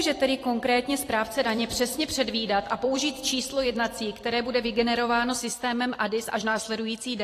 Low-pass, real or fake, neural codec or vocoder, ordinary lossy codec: 14.4 kHz; fake; vocoder, 44.1 kHz, 128 mel bands every 256 samples, BigVGAN v2; AAC, 48 kbps